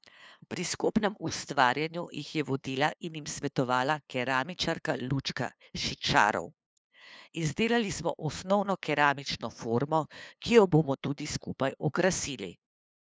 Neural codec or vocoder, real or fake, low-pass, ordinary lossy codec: codec, 16 kHz, 2 kbps, FunCodec, trained on LibriTTS, 25 frames a second; fake; none; none